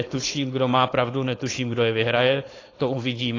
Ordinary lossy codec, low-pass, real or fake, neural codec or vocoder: AAC, 32 kbps; 7.2 kHz; fake; codec, 16 kHz, 4.8 kbps, FACodec